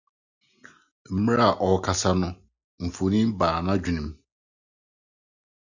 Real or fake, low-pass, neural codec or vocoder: real; 7.2 kHz; none